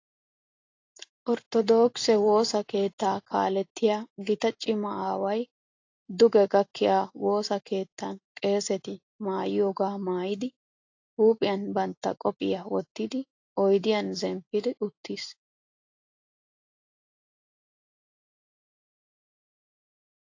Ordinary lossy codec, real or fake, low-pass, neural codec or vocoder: AAC, 48 kbps; real; 7.2 kHz; none